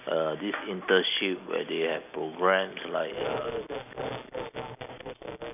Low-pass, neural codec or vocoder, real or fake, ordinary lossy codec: 3.6 kHz; none; real; none